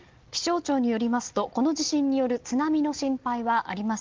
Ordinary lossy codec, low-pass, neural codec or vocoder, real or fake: Opus, 16 kbps; 7.2 kHz; none; real